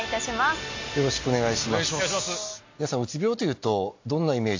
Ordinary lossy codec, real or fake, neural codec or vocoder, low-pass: AAC, 48 kbps; real; none; 7.2 kHz